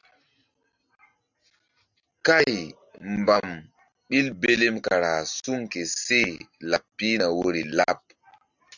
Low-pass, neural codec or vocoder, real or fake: 7.2 kHz; none; real